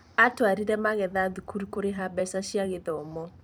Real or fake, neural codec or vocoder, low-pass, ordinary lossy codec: fake; vocoder, 44.1 kHz, 128 mel bands every 256 samples, BigVGAN v2; none; none